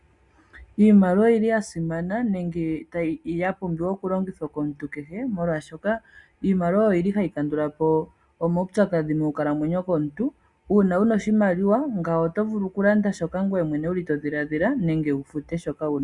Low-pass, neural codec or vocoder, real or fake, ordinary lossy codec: 10.8 kHz; none; real; Opus, 64 kbps